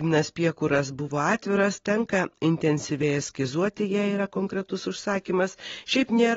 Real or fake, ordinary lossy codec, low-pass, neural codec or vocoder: real; AAC, 24 kbps; 7.2 kHz; none